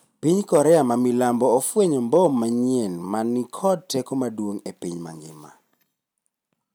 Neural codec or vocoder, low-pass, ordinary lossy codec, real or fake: none; none; none; real